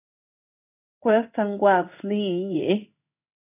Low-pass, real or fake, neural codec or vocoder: 3.6 kHz; fake; codec, 16 kHz in and 24 kHz out, 1 kbps, XY-Tokenizer